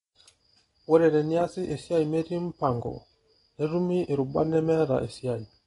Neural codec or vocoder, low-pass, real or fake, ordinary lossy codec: none; 10.8 kHz; real; AAC, 32 kbps